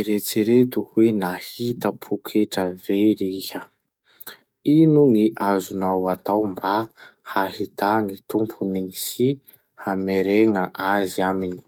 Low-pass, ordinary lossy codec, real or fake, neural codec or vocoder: 19.8 kHz; none; fake; codec, 44.1 kHz, 7.8 kbps, DAC